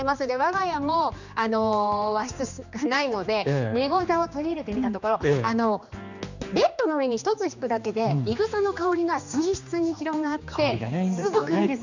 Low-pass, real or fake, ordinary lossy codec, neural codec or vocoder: 7.2 kHz; fake; none; codec, 16 kHz, 2 kbps, X-Codec, HuBERT features, trained on general audio